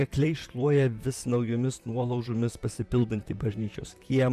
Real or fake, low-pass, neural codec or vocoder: fake; 14.4 kHz; vocoder, 44.1 kHz, 128 mel bands, Pupu-Vocoder